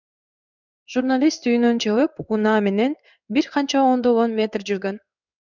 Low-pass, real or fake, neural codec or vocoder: 7.2 kHz; fake; codec, 16 kHz in and 24 kHz out, 1 kbps, XY-Tokenizer